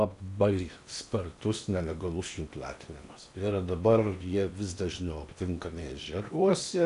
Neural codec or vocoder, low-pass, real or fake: codec, 16 kHz in and 24 kHz out, 0.8 kbps, FocalCodec, streaming, 65536 codes; 10.8 kHz; fake